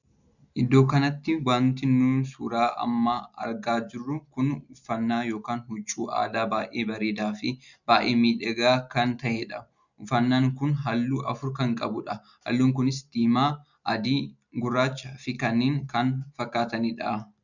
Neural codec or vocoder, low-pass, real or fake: none; 7.2 kHz; real